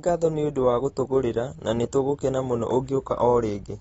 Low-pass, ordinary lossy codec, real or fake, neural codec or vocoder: 19.8 kHz; AAC, 24 kbps; real; none